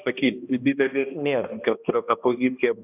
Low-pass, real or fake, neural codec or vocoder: 3.6 kHz; fake; codec, 16 kHz, 1 kbps, X-Codec, HuBERT features, trained on balanced general audio